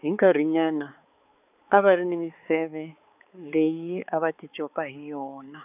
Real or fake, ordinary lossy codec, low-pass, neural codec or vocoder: fake; none; 3.6 kHz; codec, 16 kHz, 4 kbps, X-Codec, HuBERT features, trained on balanced general audio